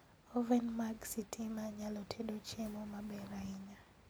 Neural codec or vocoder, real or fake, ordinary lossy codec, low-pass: none; real; none; none